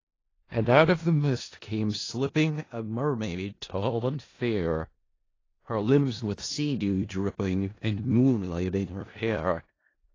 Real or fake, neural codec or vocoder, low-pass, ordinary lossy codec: fake; codec, 16 kHz in and 24 kHz out, 0.4 kbps, LongCat-Audio-Codec, four codebook decoder; 7.2 kHz; AAC, 32 kbps